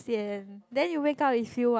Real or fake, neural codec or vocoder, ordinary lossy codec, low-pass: real; none; none; none